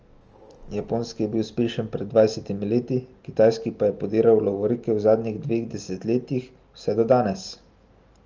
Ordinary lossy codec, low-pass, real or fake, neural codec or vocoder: Opus, 24 kbps; 7.2 kHz; real; none